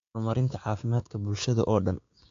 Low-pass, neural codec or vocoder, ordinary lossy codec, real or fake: 7.2 kHz; codec, 16 kHz, 6 kbps, DAC; AAC, 48 kbps; fake